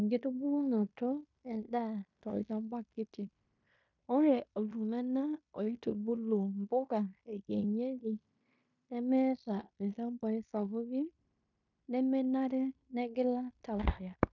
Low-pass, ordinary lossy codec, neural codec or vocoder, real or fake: 7.2 kHz; none; codec, 16 kHz in and 24 kHz out, 0.9 kbps, LongCat-Audio-Codec, fine tuned four codebook decoder; fake